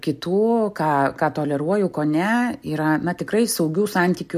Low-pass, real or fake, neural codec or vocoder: 14.4 kHz; real; none